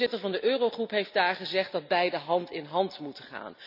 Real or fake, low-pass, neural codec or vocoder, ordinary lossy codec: real; 5.4 kHz; none; none